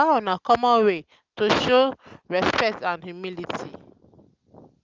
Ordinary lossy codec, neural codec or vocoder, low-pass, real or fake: Opus, 32 kbps; none; 7.2 kHz; real